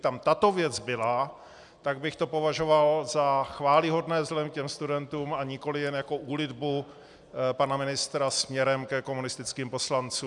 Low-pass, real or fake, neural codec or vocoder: 10.8 kHz; real; none